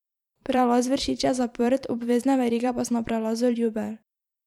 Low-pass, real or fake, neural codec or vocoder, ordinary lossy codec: 19.8 kHz; fake; vocoder, 44.1 kHz, 128 mel bands every 512 samples, BigVGAN v2; none